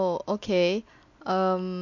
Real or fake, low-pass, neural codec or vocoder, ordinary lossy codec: real; 7.2 kHz; none; MP3, 48 kbps